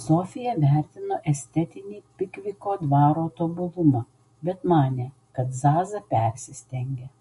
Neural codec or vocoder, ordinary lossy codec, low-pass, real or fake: none; MP3, 48 kbps; 14.4 kHz; real